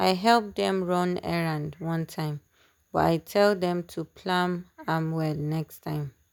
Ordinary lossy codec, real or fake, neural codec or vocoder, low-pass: none; real; none; none